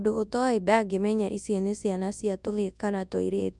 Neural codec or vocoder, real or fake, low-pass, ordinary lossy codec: codec, 24 kHz, 0.9 kbps, WavTokenizer, large speech release; fake; 10.8 kHz; none